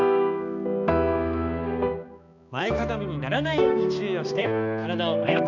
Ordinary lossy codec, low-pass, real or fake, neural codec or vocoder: none; 7.2 kHz; fake; codec, 16 kHz, 2 kbps, X-Codec, HuBERT features, trained on balanced general audio